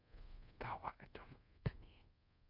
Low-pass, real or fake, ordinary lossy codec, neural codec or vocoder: 5.4 kHz; fake; MP3, 32 kbps; codec, 24 kHz, 0.5 kbps, DualCodec